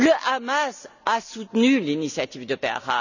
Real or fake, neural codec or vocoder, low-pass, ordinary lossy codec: real; none; 7.2 kHz; none